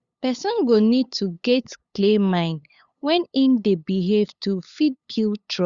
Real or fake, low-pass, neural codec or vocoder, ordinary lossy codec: fake; 7.2 kHz; codec, 16 kHz, 8 kbps, FunCodec, trained on LibriTTS, 25 frames a second; Opus, 64 kbps